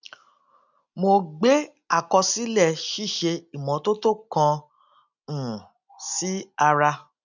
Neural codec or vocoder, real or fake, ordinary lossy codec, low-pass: none; real; none; 7.2 kHz